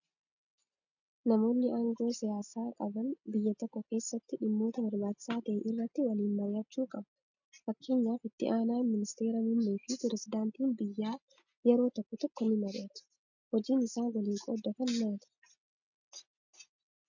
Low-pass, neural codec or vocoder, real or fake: 7.2 kHz; none; real